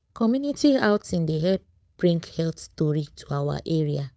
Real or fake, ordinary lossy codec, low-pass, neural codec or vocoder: fake; none; none; codec, 16 kHz, 8 kbps, FunCodec, trained on Chinese and English, 25 frames a second